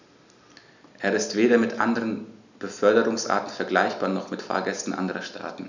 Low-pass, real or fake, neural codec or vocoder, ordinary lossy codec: 7.2 kHz; real; none; none